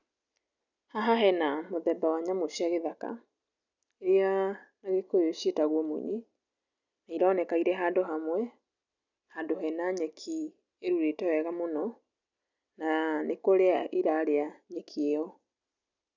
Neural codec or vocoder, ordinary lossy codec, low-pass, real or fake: none; none; 7.2 kHz; real